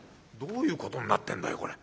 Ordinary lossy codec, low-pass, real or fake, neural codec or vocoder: none; none; real; none